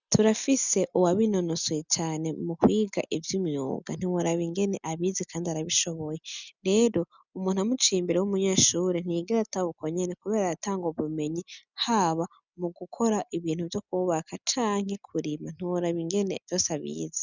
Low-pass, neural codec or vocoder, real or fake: 7.2 kHz; none; real